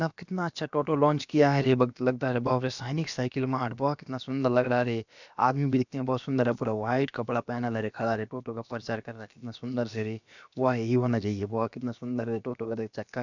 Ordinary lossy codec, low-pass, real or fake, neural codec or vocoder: none; 7.2 kHz; fake; codec, 16 kHz, about 1 kbps, DyCAST, with the encoder's durations